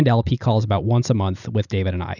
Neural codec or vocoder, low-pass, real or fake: none; 7.2 kHz; real